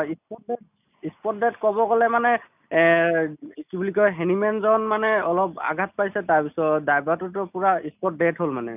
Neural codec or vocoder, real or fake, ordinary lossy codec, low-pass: none; real; none; 3.6 kHz